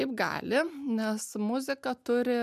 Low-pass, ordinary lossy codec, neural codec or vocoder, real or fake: 14.4 kHz; MP3, 96 kbps; none; real